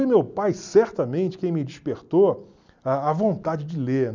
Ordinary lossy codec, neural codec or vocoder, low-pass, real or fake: none; none; 7.2 kHz; real